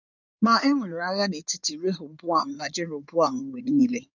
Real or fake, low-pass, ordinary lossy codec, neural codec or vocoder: fake; 7.2 kHz; none; codec, 16 kHz in and 24 kHz out, 2.2 kbps, FireRedTTS-2 codec